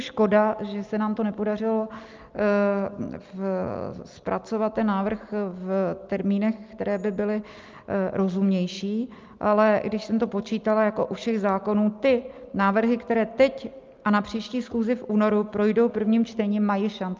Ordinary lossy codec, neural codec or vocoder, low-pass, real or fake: Opus, 24 kbps; none; 7.2 kHz; real